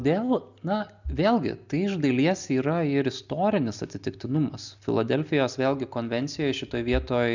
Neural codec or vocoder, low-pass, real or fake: none; 7.2 kHz; real